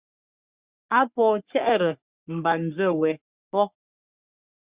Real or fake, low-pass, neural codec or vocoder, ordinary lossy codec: fake; 3.6 kHz; codec, 44.1 kHz, 3.4 kbps, Pupu-Codec; Opus, 64 kbps